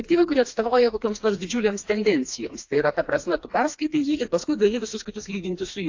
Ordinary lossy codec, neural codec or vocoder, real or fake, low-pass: AAC, 48 kbps; codec, 16 kHz, 2 kbps, FreqCodec, smaller model; fake; 7.2 kHz